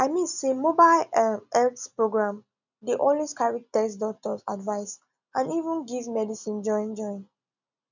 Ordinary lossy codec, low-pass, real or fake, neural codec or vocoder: none; 7.2 kHz; real; none